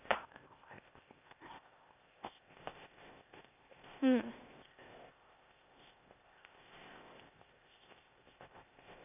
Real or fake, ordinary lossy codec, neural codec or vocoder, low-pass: fake; none; codec, 16 kHz, 0.8 kbps, ZipCodec; 3.6 kHz